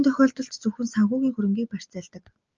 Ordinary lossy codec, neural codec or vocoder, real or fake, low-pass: Opus, 24 kbps; none; real; 7.2 kHz